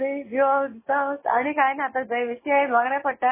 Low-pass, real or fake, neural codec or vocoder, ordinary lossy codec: 3.6 kHz; real; none; MP3, 16 kbps